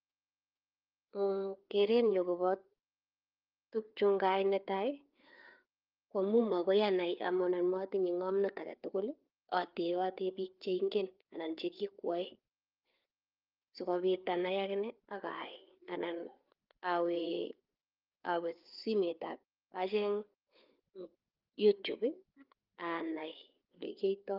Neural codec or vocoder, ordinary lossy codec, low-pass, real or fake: codec, 16 kHz, 4 kbps, FreqCodec, larger model; Opus, 24 kbps; 5.4 kHz; fake